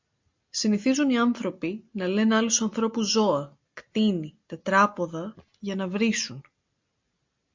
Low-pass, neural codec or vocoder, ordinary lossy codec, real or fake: 7.2 kHz; none; MP3, 48 kbps; real